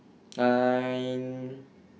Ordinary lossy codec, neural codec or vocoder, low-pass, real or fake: none; none; none; real